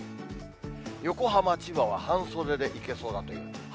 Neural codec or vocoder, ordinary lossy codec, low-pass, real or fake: none; none; none; real